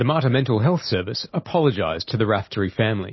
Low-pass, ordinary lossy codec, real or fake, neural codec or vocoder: 7.2 kHz; MP3, 24 kbps; real; none